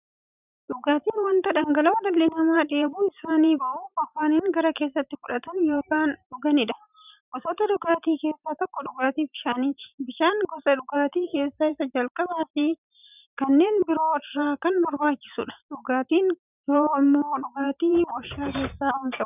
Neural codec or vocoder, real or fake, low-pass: vocoder, 44.1 kHz, 128 mel bands every 512 samples, BigVGAN v2; fake; 3.6 kHz